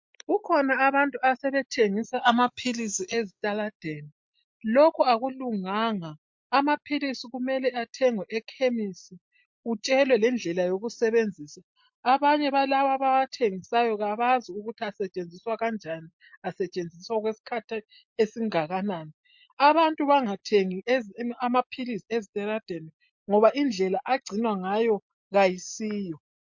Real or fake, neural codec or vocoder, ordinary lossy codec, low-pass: real; none; MP3, 48 kbps; 7.2 kHz